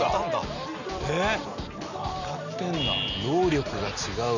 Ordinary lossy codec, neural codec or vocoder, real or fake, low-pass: none; none; real; 7.2 kHz